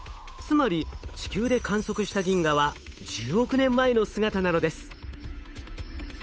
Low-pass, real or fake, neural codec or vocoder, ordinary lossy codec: none; fake; codec, 16 kHz, 8 kbps, FunCodec, trained on Chinese and English, 25 frames a second; none